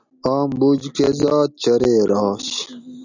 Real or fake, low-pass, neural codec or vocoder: real; 7.2 kHz; none